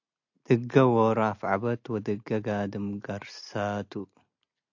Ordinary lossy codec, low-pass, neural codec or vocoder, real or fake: AAC, 48 kbps; 7.2 kHz; none; real